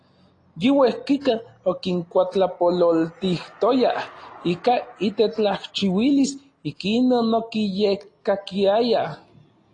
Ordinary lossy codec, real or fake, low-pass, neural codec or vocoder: AAC, 32 kbps; real; 9.9 kHz; none